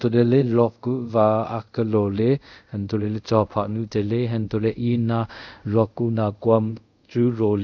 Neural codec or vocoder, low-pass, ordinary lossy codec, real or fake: codec, 24 kHz, 0.5 kbps, DualCodec; 7.2 kHz; none; fake